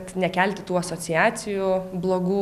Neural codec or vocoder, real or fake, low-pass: none; real; 14.4 kHz